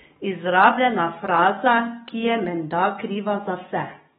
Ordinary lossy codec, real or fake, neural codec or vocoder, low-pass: AAC, 16 kbps; fake; codec, 44.1 kHz, 7.8 kbps, DAC; 19.8 kHz